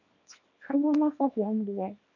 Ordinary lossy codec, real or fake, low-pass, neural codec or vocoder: none; fake; 7.2 kHz; codec, 24 kHz, 0.9 kbps, WavTokenizer, small release